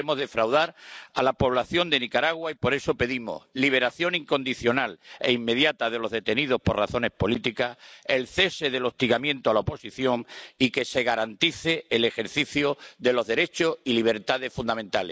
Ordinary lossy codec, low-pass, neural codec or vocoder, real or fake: none; none; none; real